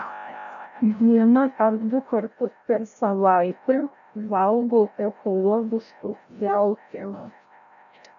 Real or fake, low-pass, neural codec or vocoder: fake; 7.2 kHz; codec, 16 kHz, 0.5 kbps, FreqCodec, larger model